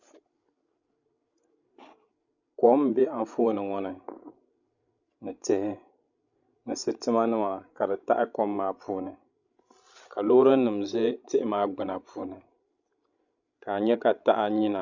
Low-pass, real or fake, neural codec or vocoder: 7.2 kHz; fake; codec, 16 kHz, 16 kbps, FreqCodec, larger model